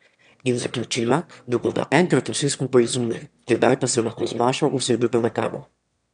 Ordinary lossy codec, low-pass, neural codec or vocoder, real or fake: none; 9.9 kHz; autoencoder, 22.05 kHz, a latent of 192 numbers a frame, VITS, trained on one speaker; fake